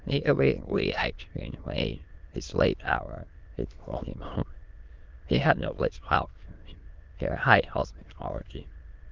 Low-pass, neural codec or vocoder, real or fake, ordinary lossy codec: 7.2 kHz; autoencoder, 22.05 kHz, a latent of 192 numbers a frame, VITS, trained on many speakers; fake; Opus, 32 kbps